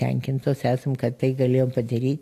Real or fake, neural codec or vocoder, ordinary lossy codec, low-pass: real; none; AAC, 64 kbps; 14.4 kHz